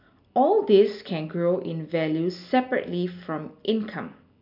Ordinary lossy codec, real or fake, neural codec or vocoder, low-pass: MP3, 48 kbps; real; none; 5.4 kHz